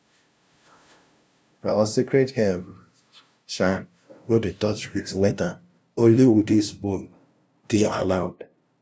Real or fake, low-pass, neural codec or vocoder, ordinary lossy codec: fake; none; codec, 16 kHz, 0.5 kbps, FunCodec, trained on LibriTTS, 25 frames a second; none